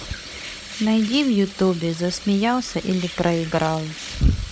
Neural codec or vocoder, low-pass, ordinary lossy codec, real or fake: codec, 16 kHz, 16 kbps, FunCodec, trained on Chinese and English, 50 frames a second; none; none; fake